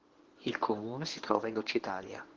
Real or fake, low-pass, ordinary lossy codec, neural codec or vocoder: fake; 7.2 kHz; Opus, 16 kbps; codec, 24 kHz, 0.9 kbps, WavTokenizer, medium speech release version 1